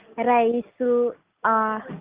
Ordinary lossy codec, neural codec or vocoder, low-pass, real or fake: Opus, 24 kbps; none; 3.6 kHz; real